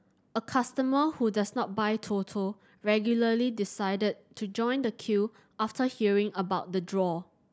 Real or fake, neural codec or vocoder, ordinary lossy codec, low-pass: real; none; none; none